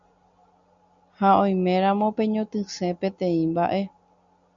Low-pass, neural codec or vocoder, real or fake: 7.2 kHz; none; real